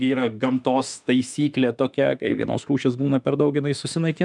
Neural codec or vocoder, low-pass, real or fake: autoencoder, 48 kHz, 32 numbers a frame, DAC-VAE, trained on Japanese speech; 10.8 kHz; fake